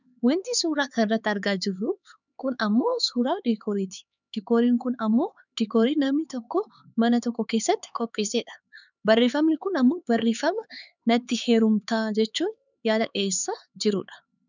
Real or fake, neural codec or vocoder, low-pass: fake; codec, 16 kHz, 4 kbps, X-Codec, HuBERT features, trained on LibriSpeech; 7.2 kHz